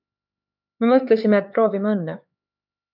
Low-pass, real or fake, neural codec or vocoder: 5.4 kHz; fake; codec, 16 kHz, 4 kbps, X-Codec, HuBERT features, trained on LibriSpeech